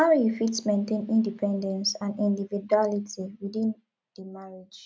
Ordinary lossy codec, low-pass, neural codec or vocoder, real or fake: none; none; none; real